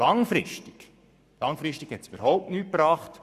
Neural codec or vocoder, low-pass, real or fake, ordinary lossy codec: codec, 44.1 kHz, 7.8 kbps, Pupu-Codec; 14.4 kHz; fake; none